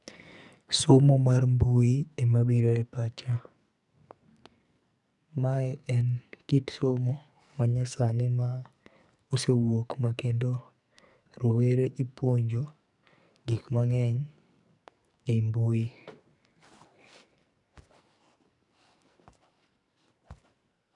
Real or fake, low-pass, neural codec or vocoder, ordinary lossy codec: fake; 10.8 kHz; codec, 44.1 kHz, 2.6 kbps, SNAC; none